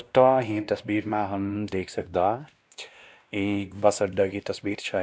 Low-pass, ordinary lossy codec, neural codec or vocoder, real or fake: none; none; codec, 16 kHz, 1 kbps, X-Codec, WavLM features, trained on Multilingual LibriSpeech; fake